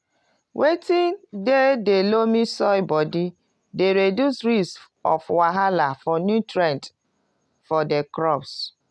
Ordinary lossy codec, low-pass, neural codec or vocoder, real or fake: none; none; none; real